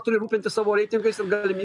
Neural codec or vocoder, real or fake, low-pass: none; real; 10.8 kHz